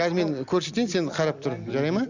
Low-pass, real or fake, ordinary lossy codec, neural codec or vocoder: 7.2 kHz; real; Opus, 64 kbps; none